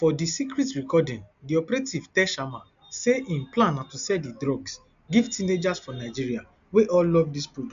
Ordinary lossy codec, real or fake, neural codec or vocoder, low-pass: MP3, 64 kbps; real; none; 7.2 kHz